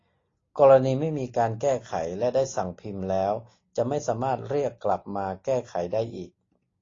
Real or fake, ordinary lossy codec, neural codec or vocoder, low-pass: real; AAC, 32 kbps; none; 7.2 kHz